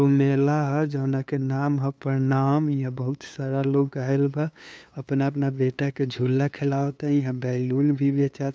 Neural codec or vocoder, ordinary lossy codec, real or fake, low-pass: codec, 16 kHz, 2 kbps, FunCodec, trained on LibriTTS, 25 frames a second; none; fake; none